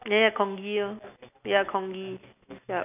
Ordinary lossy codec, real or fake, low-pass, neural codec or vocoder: none; real; 3.6 kHz; none